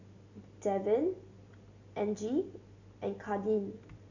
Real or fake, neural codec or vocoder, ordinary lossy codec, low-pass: real; none; none; 7.2 kHz